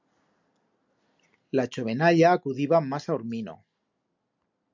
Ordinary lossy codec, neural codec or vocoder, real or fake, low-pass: MP3, 64 kbps; none; real; 7.2 kHz